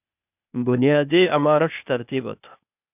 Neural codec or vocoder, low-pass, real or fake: codec, 16 kHz, 0.8 kbps, ZipCodec; 3.6 kHz; fake